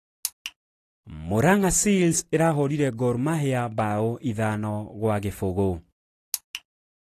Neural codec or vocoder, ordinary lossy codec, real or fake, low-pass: none; AAC, 48 kbps; real; 14.4 kHz